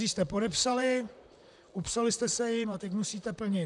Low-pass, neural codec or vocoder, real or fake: 10.8 kHz; vocoder, 44.1 kHz, 128 mel bands, Pupu-Vocoder; fake